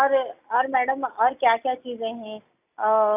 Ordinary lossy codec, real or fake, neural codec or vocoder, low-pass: none; real; none; 3.6 kHz